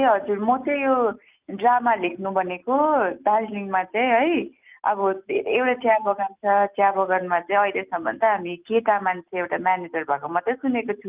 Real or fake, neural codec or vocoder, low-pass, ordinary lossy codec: real; none; 3.6 kHz; Opus, 24 kbps